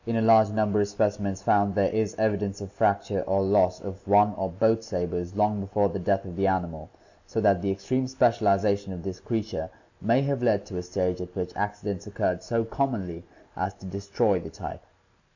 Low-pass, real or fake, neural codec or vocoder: 7.2 kHz; real; none